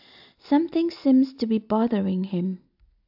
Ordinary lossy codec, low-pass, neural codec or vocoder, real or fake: AAC, 48 kbps; 5.4 kHz; none; real